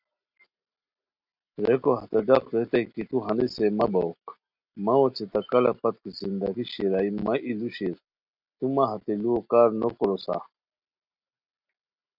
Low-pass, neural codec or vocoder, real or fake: 5.4 kHz; none; real